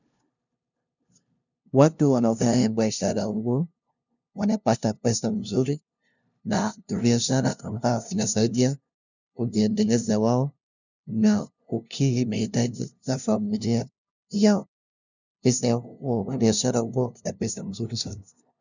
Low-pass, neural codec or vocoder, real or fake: 7.2 kHz; codec, 16 kHz, 0.5 kbps, FunCodec, trained on LibriTTS, 25 frames a second; fake